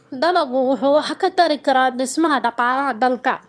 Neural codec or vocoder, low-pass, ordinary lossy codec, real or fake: autoencoder, 22.05 kHz, a latent of 192 numbers a frame, VITS, trained on one speaker; none; none; fake